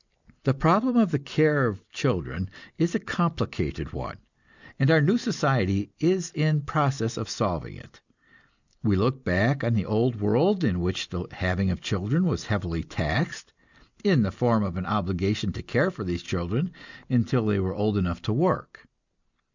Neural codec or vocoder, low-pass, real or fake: none; 7.2 kHz; real